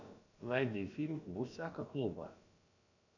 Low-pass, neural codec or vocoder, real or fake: 7.2 kHz; codec, 16 kHz, about 1 kbps, DyCAST, with the encoder's durations; fake